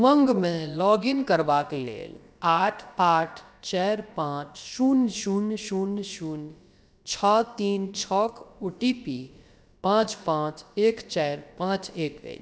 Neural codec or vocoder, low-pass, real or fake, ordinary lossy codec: codec, 16 kHz, about 1 kbps, DyCAST, with the encoder's durations; none; fake; none